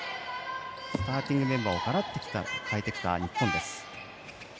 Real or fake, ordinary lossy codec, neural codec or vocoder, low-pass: real; none; none; none